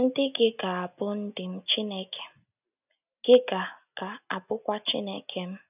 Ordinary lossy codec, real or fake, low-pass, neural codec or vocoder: none; real; 3.6 kHz; none